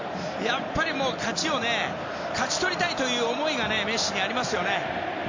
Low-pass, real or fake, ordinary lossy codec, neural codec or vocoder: 7.2 kHz; real; MP3, 64 kbps; none